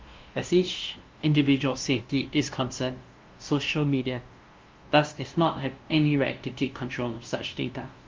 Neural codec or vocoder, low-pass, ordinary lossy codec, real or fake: codec, 16 kHz, 0.5 kbps, FunCodec, trained on LibriTTS, 25 frames a second; 7.2 kHz; Opus, 32 kbps; fake